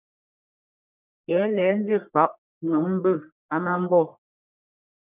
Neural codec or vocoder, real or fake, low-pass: codec, 44.1 kHz, 1.7 kbps, Pupu-Codec; fake; 3.6 kHz